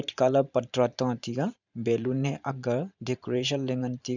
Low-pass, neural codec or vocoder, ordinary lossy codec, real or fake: 7.2 kHz; vocoder, 44.1 kHz, 128 mel bands every 512 samples, BigVGAN v2; none; fake